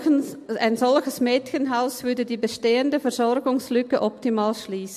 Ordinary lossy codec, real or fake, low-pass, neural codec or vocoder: MP3, 64 kbps; real; 14.4 kHz; none